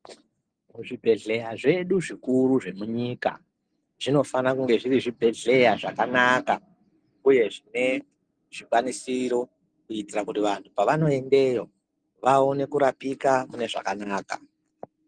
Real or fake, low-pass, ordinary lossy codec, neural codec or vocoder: real; 9.9 kHz; Opus, 24 kbps; none